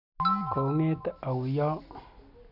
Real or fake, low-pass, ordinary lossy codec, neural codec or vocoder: real; 5.4 kHz; none; none